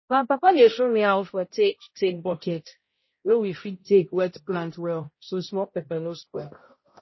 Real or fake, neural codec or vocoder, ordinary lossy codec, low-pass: fake; codec, 16 kHz, 0.5 kbps, X-Codec, HuBERT features, trained on balanced general audio; MP3, 24 kbps; 7.2 kHz